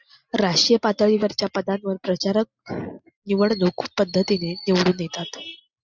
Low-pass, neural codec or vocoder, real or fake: 7.2 kHz; none; real